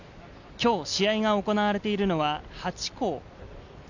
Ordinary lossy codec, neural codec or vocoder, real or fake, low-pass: none; none; real; 7.2 kHz